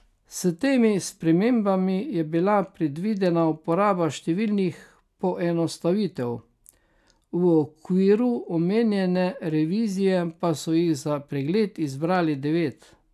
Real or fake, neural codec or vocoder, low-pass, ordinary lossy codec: real; none; 14.4 kHz; AAC, 96 kbps